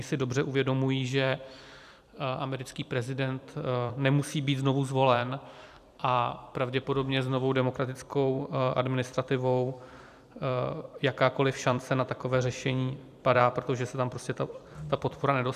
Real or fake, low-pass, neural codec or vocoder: fake; 14.4 kHz; vocoder, 44.1 kHz, 128 mel bands every 256 samples, BigVGAN v2